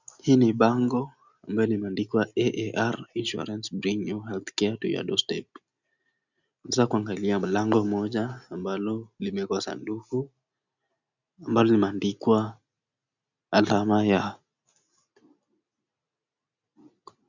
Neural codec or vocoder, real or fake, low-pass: none; real; 7.2 kHz